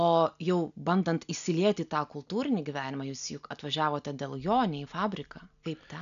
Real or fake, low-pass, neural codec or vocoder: real; 7.2 kHz; none